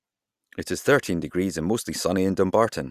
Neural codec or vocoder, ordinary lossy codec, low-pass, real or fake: none; Opus, 64 kbps; 14.4 kHz; real